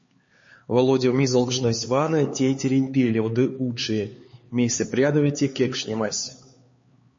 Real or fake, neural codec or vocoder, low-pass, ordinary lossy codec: fake; codec, 16 kHz, 4 kbps, X-Codec, HuBERT features, trained on LibriSpeech; 7.2 kHz; MP3, 32 kbps